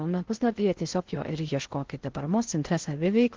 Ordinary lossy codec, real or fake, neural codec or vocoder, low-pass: Opus, 16 kbps; fake; codec, 16 kHz in and 24 kHz out, 0.6 kbps, FocalCodec, streaming, 4096 codes; 7.2 kHz